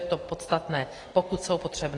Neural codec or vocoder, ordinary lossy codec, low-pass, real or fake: none; AAC, 32 kbps; 10.8 kHz; real